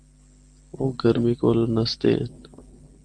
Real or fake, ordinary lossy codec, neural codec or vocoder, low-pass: real; Opus, 24 kbps; none; 9.9 kHz